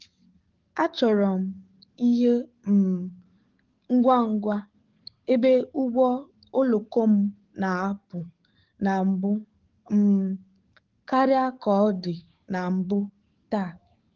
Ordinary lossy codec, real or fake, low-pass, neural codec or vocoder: Opus, 16 kbps; fake; 7.2 kHz; codec, 44.1 kHz, 7.8 kbps, DAC